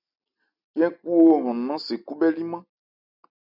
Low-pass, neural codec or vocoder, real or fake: 5.4 kHz; none; real